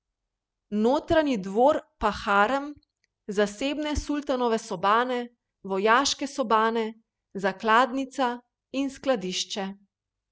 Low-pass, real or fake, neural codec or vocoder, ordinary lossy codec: none; real; none; none